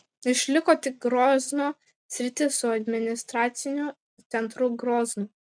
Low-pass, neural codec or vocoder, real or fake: 9.9 kHz; none; real